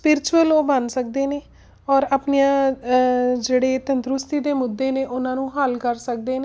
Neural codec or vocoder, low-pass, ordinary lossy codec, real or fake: none; none; none; real